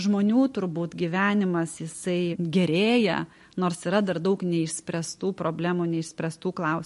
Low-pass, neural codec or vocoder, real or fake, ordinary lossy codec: 14.4 kHz; none; real; MP3, 48 kbps